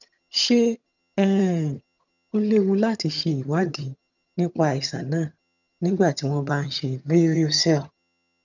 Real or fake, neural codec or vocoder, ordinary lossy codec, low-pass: fake; vocoder, 22.05 kHz, 80 mel bands, HiFi-GAN; none; 7.2 kHz